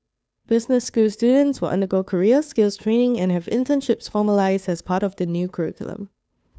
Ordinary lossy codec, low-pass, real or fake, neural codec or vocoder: none; none; fake; codec, 16 kHz, 2 kbps, FunCodec, trained on Chinese and English, 25 frames a second